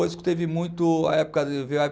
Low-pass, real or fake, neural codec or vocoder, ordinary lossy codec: none; real; none; none